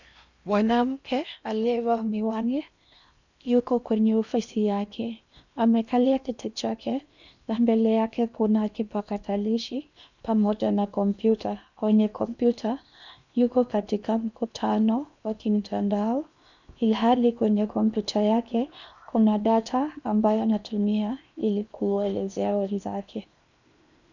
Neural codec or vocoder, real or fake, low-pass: codec, 16 kHz in and 24 kHz out, 0.8 kbps, FocalCodec, streaming, 65536 codes; fake; 7.2 kHz